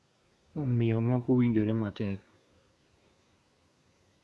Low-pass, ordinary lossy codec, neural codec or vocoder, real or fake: none; none; codec, 24 kHz, 1 kbps, SNAC; fake